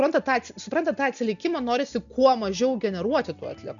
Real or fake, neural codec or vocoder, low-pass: real; none; 7.2 kHz